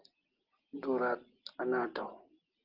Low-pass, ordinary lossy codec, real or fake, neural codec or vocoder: 5.4 kHz; Opus, 16 kbps; real; none